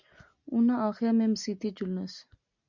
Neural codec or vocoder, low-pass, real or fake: none; 7.2 kHz; real